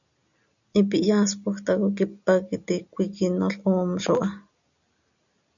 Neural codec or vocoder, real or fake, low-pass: none; real; 7.2 kHz